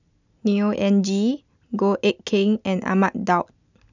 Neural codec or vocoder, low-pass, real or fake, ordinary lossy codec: none; 7.2 kHz; real; none